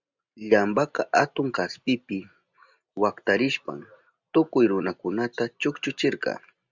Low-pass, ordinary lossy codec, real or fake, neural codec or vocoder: 7.2 kHz; Opus, 64 kbps; real; none